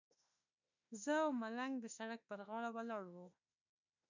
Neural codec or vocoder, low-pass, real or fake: autoencoder, 48 kHz, 32 numbers a frame, DAC-VAE, trained on Japanese speech; 7.2 kHz; fake